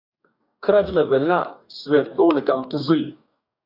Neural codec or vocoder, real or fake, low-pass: codec, 44.1 kHz, 2.6 kbps, DAC; fake; 5.4 kHz